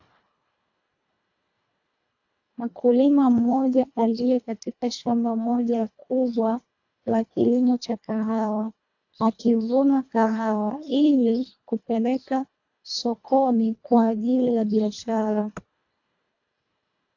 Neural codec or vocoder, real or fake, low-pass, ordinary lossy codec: codec, 24 kHz, 1.5 kbps, HILCodec; fake; 7.2 kHz; AAC, 48 kbps